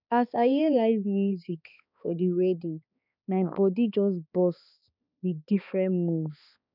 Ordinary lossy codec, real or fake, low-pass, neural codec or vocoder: none; fake; 5.4 kHz; codec, 16 kHz, 2 kbps, X-Codec, HuBERT features, trained on balanced general audio